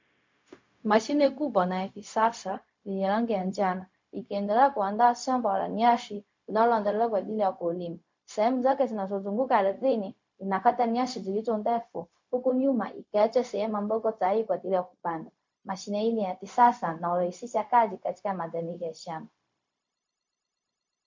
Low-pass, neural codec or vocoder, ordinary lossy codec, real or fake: 7.2 kHz; codec, 16 kHz, 0.4 kbps, LongCat-Audio-Codec; MP3, 48 kbps; fake